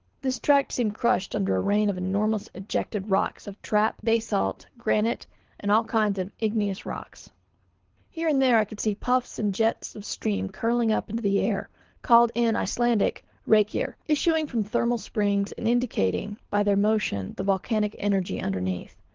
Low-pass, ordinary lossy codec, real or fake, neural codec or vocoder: 7.2 kHz; Opus, 16 kbps; fake; codec, 24 kHz, 6 kbps, HILCodec